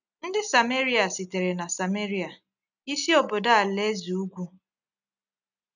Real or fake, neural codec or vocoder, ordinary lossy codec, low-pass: real; none; none; 7.2 kHz